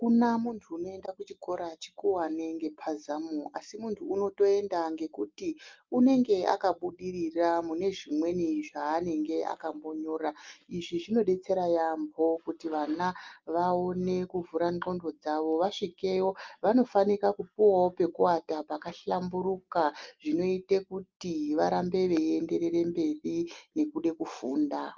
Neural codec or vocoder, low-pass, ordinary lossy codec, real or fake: none; 7.2 kHz; Opus, 24 kbps; real